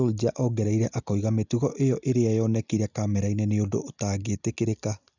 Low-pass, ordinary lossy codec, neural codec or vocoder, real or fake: 7.2 kHz; none; none; real